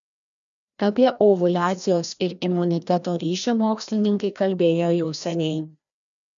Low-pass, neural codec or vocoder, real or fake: 7.2 kHz; codec, 16 kHz, 1 kbps, FreqCodec, larger model; fake